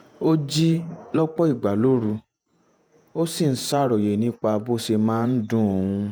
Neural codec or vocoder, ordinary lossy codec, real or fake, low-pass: vocoder, 48 kHz, 128 mel bands, Vocos; none; fake; none